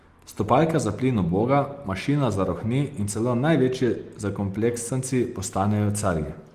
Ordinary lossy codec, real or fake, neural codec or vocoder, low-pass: Opus, 24 kbps; real; none; 14.4 kHz